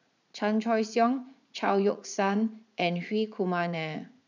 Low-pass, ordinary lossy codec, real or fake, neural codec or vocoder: 7.2 kHz; none; fake; vocoder, 44.1 kHz, 80 mel bands, Vocos